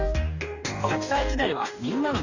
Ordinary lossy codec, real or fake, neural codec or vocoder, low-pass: none; fake; codec, 44.1 kHz, 2.6 kbps, DAC; 7.2 kHz